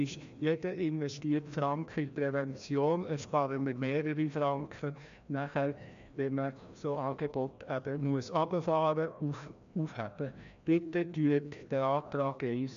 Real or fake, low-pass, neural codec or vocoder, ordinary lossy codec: fake; 7.2 kHz; codec, 16 kHz, 1 kbps, FreqCodec, larger model; MP3, 64 kbps